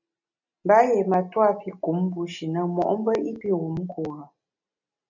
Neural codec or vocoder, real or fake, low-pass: none; real; 7.2 kHz